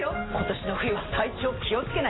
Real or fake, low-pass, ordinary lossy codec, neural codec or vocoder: real; 7.2 kHz; AAC, 16 kbps; none